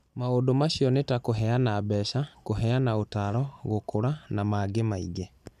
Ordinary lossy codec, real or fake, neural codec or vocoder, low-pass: none; real; none; 14.4 kHz